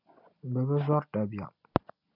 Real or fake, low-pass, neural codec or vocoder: real; 5.4 kHz; none